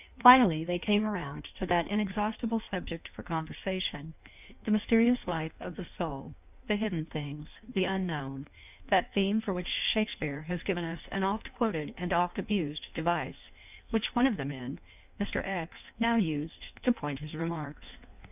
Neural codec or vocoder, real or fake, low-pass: codec, 16 kHz in and 24 kHz out, 1.1 kbps, FireRedTTS-2 codec; fake; 3.6 kHz